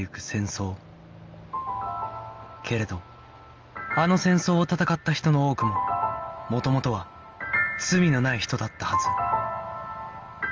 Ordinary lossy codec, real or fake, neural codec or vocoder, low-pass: Opus, 24 kbps; real; none; 7.2 kHz